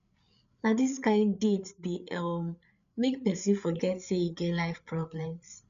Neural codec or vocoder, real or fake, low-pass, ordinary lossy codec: codec, 16 kHz, 8 kbps, FreqCodec, larger model; fake; 7.2 kHz; none